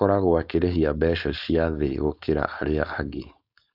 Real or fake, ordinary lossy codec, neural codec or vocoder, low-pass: fake; none; codec, 16 kHz, 4.8 kbps, FACodec; 5.4 kHz